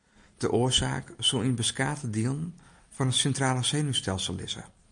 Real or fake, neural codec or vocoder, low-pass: real; none; 9.9 kHz